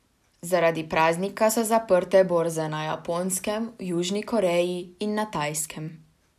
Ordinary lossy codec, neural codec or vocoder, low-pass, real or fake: none; none; 14.4 kHz; real